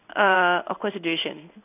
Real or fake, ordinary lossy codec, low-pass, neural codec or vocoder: fake; none; 3.6 kHz; codec, 16 kHz in and 24 kHz out, 1 kbps, XY-Tokenizer